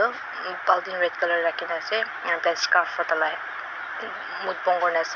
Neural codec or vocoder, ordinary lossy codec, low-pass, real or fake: none; none; 7.2 kHz; real